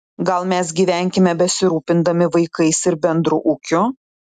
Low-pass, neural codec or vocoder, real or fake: 14.4 kHz; none; real